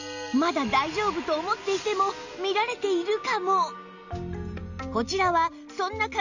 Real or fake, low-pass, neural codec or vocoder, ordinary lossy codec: real; 7.2 kHz; none; none